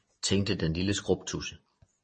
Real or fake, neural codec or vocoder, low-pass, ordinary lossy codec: real; none; 9.9 kHz; MP3, 32 kbps